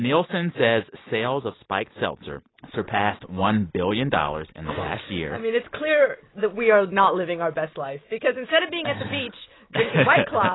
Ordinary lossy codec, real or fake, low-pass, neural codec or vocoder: AAC, 16 kbps; real; 7.2 kHz; none